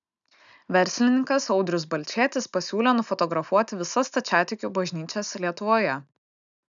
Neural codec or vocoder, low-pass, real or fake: none; 7.2 kHz; real